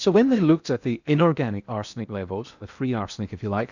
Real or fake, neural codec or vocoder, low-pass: fake; codec, 16 kHz in and 24 kHz out, 0.8 kbps, FocalCodec, streaming, 65536 codes; 7.2 kHz